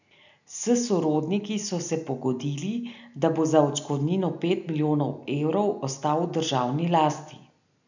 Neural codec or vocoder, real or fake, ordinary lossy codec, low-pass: none; real; none; 7.2 kHz